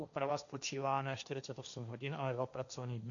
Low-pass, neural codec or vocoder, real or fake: 7.2 kHz; codec, 16 kHz, 1.1 kbps, Voila-Tokenizer; fake